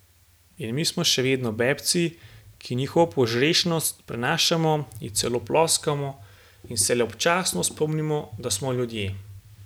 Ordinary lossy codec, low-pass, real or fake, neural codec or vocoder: none; none; real; none